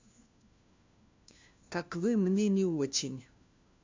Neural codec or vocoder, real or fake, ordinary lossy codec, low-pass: codec, 16 kHz, 1 kbps, FunCodec, trained on LibriTTS, 50 frames a second; fake; MP3, 64 kbps; 7.2 kHz